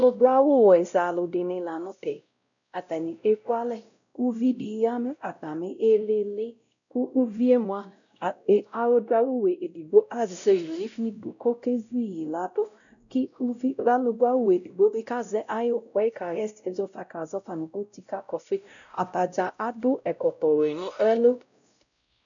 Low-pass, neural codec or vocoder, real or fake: 7.2 kHz; codec, 16 kHz, 0.5 kbps, X-Codec, WavLM features, trained on Multilingual LibriSpeech; fake